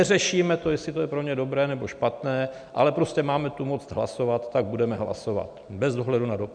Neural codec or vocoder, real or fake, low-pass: none; real; 9.9 kHz